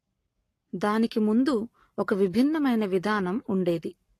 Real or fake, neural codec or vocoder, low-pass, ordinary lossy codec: fake; codec, 44.1 kHz, 7.8 kbps, Pupu-Codec; 14.4 kHz; AAC, 48 kbps